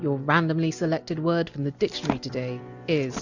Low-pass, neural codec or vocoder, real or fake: 7.2 kHz; none; real